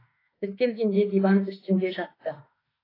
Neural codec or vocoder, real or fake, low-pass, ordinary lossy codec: autoencoder, 48 kHz, 32 numbers a frame, DAC-VAE, trained on Japanese speech; fake; 5.4 kHz; AAC, 24 kbps